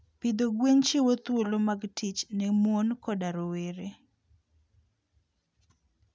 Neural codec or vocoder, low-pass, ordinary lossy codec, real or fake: none; none; none; real